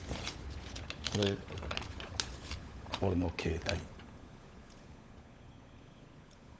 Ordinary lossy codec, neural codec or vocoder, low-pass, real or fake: none; codec, 16 kHz, 16 kbps, FunCodec, trained on LibriTTS, 50 frames a second; none; fake